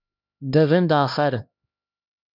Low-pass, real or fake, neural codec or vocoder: 5.4 kHz; fake; codec, 16 kHz, 1 kbps, X-Codec, HuBERT features, trained on LibriSpeech